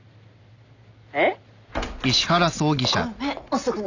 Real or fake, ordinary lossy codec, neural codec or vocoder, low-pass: real; none; none; 7.2 kHz